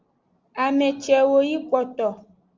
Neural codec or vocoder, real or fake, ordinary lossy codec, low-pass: none; real; Opus, 32 kbps; 7.2 kHz